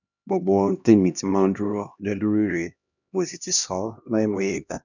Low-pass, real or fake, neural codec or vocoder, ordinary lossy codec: 7.2 kHz; fake; codec, 16 kHz, 1 kbps, X-Codec, HuBERT features, trained on LibriSpeech; none